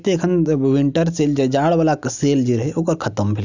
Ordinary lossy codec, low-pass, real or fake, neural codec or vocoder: none; 7.2 kHz; real; none